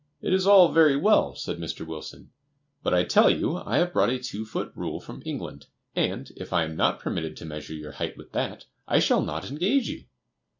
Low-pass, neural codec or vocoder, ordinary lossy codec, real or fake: 7.2 kHz; none; MP3, 64 kbps; real